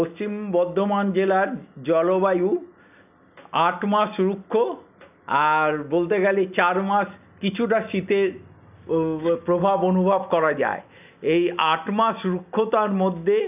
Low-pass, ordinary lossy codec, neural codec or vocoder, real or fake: 3.6 kHz; none; none; real